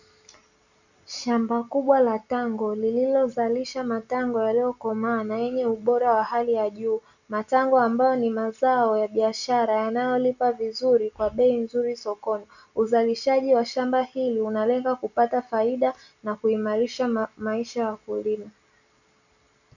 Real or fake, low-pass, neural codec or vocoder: real; 7.2 kHz; none